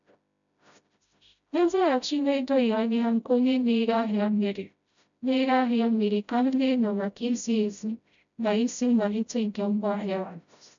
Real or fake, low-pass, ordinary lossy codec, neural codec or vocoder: fake; 7.2 kHz; none; codec, 16 kHz, 0.5 kbps, FreqCodec, smaller model